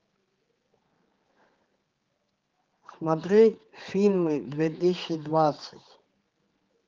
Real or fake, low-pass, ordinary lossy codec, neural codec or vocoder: fake; 7.2 kHz; Opus, 16 kbps; codec, 16 kHz, 4 kbps, X-Codec, HuBERT features, trained on general audio